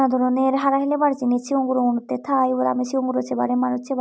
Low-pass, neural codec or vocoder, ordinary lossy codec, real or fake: none; none; none; real